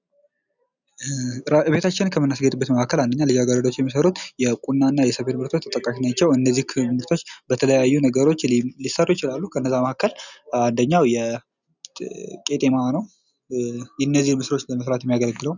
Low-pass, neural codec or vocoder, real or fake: 7.2 kHz; none; real